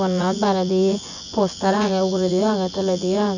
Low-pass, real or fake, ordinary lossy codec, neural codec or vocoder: 7.2 kHz; fake; none; vocoder, 24 kHz, 100 mel bands, Vocos